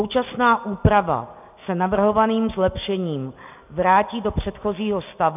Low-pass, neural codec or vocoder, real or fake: 3.6 kHz; none; real